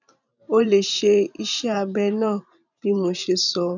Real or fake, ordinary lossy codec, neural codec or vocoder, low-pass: fake; none; vocoder, 44.1 kHz, 128 mel bands every 512 samples, BigVGAN v2; 7.2 kHz